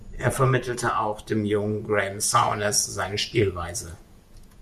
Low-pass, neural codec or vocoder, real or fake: 14.4 kHz; vocoder, 48 kHz, 128 mel bands, Vocos; fake